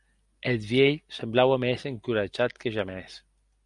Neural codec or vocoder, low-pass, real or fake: none; 10.8 kHz; real